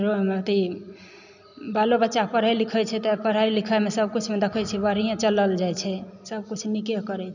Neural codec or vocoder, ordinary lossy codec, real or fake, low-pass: none; none; real; 7.2 kHz